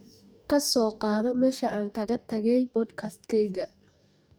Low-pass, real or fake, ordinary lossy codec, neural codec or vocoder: none; fake; none; codec, 44.1 kHz, 2.6 kbps, DAC